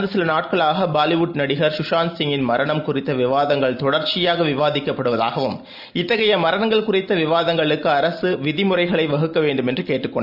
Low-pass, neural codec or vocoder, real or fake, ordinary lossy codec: 5.4 kHz; none; real; none